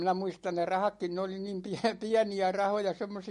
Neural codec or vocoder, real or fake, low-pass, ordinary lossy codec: none; real; 14.4 kHz; MP3, 48 kbps